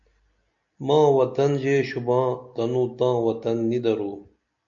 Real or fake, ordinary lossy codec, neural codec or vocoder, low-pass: real; MP3, 96 kbps; none; 7.2 kHz